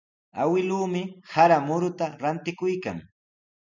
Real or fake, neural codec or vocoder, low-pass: real; none; 7.2 kHz